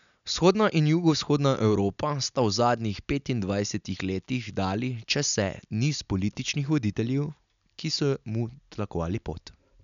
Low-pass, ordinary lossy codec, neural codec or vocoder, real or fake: 7.2 kHz; none; none; real